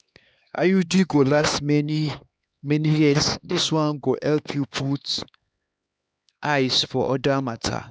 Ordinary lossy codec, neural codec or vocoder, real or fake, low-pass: none; codec, 16 kHz, 2 kbps, X-Codec, HuBERT features, trained on LibriSpeech; fake; none